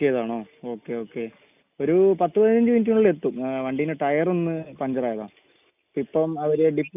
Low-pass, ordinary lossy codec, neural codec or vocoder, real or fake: 3.6 kHz; none; none; real